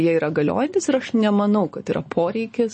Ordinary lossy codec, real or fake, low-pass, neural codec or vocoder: MP3, 32 kbps; real; 9.9 kHz; none